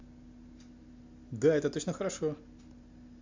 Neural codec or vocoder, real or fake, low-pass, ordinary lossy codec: none; real; 7.2 kHz; MP3, 64 kbps